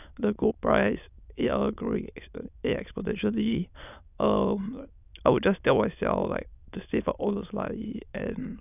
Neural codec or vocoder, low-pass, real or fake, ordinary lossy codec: autoencoder, 22.05 kHz, a latent of 192 numbers a frame, VITS, trained on many speakers; 3.6 kHz; fake; none